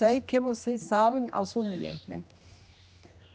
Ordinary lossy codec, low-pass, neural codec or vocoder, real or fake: none; none; codec, 16 kHz, 1 kbps, X-Codec, HuBERT features, trained on general audio; fake